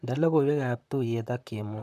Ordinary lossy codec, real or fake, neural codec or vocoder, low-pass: none; fake; vocoder, 44.1 kHz, 128 mel bands, Pupu-Vocoder; 14.4 kHz